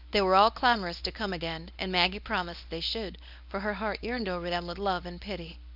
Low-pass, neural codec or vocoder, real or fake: 5.4 kHz; codec, 24 kHz, 0.9 kbps, WavTokenizer, medium speech release version 1; fake